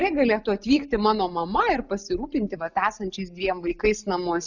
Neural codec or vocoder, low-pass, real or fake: none; 7.2 kHz; real